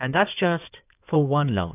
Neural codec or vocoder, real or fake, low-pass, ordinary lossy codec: codec, 16 kHz in and 24 kHz out, 2.2 kbps, FireRedTTS-2 codec; fake; 3.6 kHz; AAC, 32 kbps